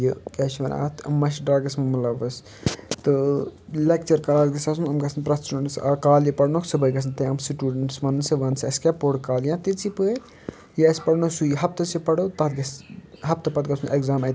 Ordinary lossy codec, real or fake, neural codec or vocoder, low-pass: none; real; none; none